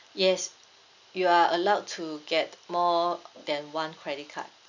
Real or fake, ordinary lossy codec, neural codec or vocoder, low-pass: real; none; none; 7.2 kHz